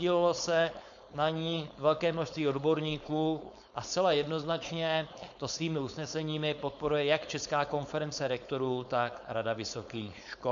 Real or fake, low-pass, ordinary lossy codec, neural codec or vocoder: fake; 7.2 kHz; MP3, 96 kbps; codec, 16 kHz, 4.8 kbps, FACodec